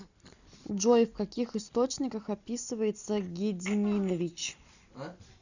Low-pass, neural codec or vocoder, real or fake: 7.2 kHz; none; real